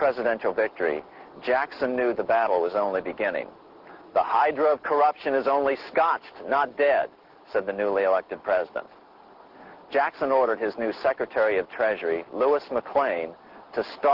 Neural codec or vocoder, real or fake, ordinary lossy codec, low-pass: none; real; Opus, 16 kbps; 5.4 kHz